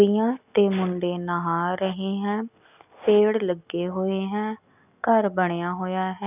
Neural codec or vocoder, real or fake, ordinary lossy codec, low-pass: none; real; none; 3.6 kHz